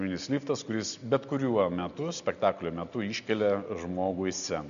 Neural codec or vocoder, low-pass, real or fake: none; 7.2 kHz; real